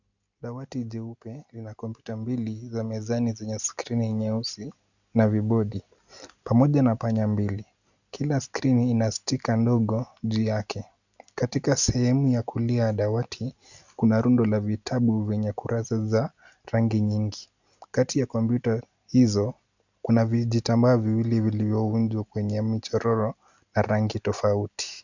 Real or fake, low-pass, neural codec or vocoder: real; 7.2 kHz; none